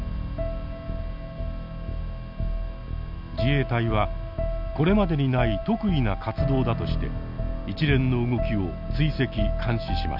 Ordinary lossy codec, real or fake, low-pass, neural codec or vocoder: none; real; 5.4 kHz; none